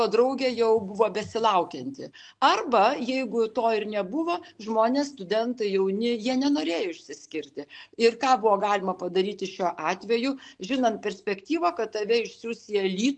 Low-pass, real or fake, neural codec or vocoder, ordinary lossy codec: 9.9 kHz; real; none; MP3, 64 kbps